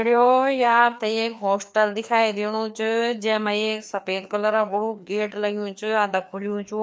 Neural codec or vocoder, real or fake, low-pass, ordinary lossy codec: codec, 16 kHz, 2 kbps, FreqCodec, larger model; fake; none; none